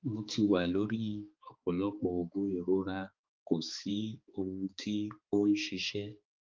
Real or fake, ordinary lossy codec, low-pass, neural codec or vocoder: fake; Opus, 32 kbps; 7.2 kHz; codec, 16 kHz, 4 kbps, X-Codec, HuBERT features, trained on balanced general audio